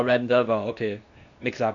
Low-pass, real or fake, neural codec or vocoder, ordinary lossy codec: 7.2 kHz; fake; codec, 16 kHz, 0.8 kbps, ZipCodec; AAC, 64 kbps